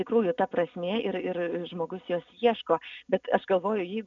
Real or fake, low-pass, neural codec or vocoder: real; 7.2 kHz; none